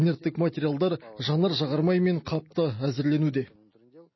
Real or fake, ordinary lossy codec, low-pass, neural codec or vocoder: real; MP3, 24 kbps; 7.2 kHz; none